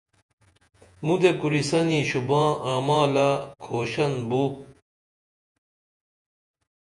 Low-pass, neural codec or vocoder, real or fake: 10.8 kHz; vocoder, 48 kHz, 128 mel bands, Vocos; fake